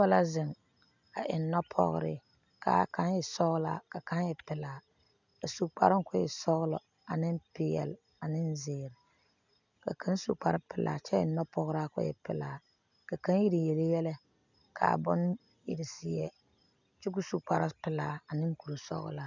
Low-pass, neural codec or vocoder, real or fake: 7.2 kHz; none; real